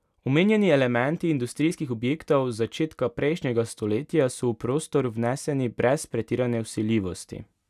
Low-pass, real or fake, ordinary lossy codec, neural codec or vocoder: 14.4 kHz; real; none; none